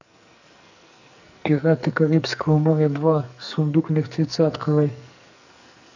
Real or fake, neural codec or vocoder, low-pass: fake; codec, 44.1 kHz, 2.6 kbps, SNAC; 7.2 kHz